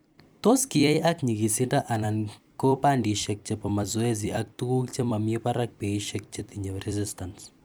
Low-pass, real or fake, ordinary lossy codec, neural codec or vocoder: none; fake; none; vocoder, 44.1 kHz, 128 mel bands every 256 samples, BigVGAN v2